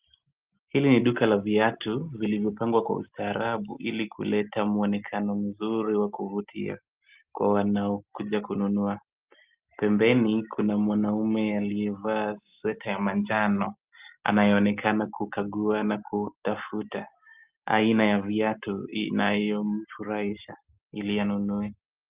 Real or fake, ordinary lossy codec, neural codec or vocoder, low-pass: real; Opus, 32 kbps; none; 3.6 kHz